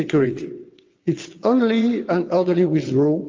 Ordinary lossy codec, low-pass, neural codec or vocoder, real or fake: Opus, 24 kbps; 7.2 kHz; vocoder, 22.05 kHz, 80 mel bands, WaveNeXt; fake